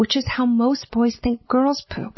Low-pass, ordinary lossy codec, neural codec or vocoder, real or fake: 7.2 kHz; MP3, 24 kbps; none; real